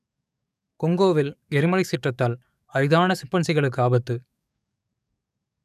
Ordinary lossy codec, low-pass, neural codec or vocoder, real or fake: none; 14.4 kHz; codec, 44.1 kHz, 7.8 kbps, DAC; fake